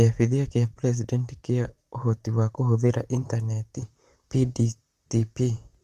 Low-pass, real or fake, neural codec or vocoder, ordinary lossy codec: 14.4 kHz; fake; vocoder, 44.1 kHz, 128 mel bands, Pupu-Vocoder; Opus, 32 kbps